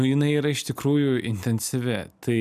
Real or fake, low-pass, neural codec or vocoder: real; 14.4 kHz; none